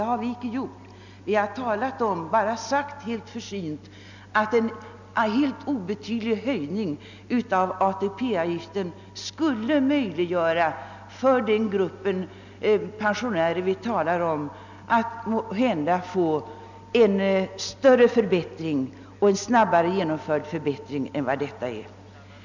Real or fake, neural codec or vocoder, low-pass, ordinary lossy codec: real; none; 7.2 kHz; none